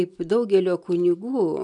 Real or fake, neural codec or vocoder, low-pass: real; none; 10.8 kHz